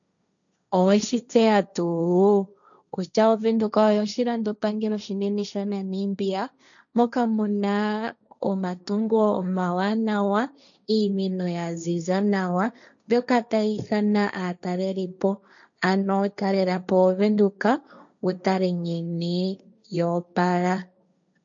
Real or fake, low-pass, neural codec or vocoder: fake; 7.2 kHz; codec, 16 kHz, 1.1 kbps, Voila-Tokenizer